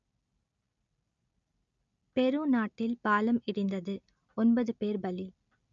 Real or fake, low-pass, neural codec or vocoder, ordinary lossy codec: real; 7.2 kHz; none; none